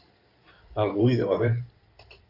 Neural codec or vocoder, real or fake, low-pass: codec, 16 kHz in and 24 kHz out, 2.2 kbps, FireRedTTS-2 codec; fake; 5.4 kHz